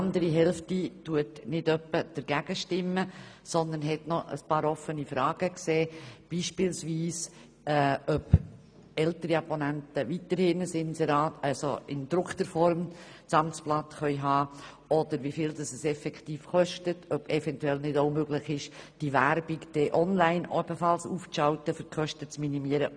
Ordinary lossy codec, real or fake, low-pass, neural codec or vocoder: none; real; 9.9 kHz; none